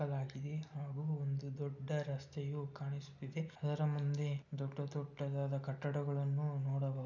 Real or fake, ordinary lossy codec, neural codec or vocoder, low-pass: real; none; none; 7.2 kHz